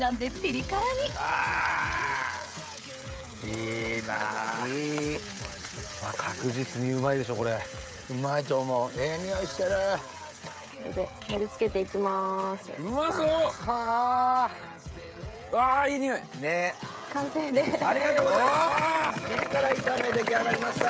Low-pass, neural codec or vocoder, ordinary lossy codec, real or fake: none; codec, 16 kHz, 16 kbps, FreqCodec, smaller model; none; fake